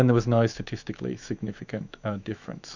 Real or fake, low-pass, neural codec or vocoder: fake; 7.2 kHz; autoencoder, 48 kHz, 128 numbers a frame, DAC-VAE, trained on Japanese speech